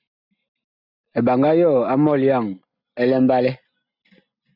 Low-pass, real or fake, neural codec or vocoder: 5.4 kHz; real; none